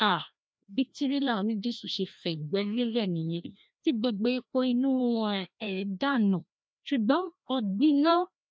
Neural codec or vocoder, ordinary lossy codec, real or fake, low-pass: codec, 16 kHz, 1 kbps, FreqCodec, larger model; none; fake; none